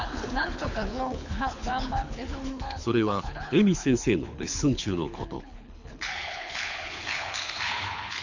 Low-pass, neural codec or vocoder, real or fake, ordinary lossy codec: 7.2 kHz; codec, 24 kHz, 6 kbps, HILCodec; fake; none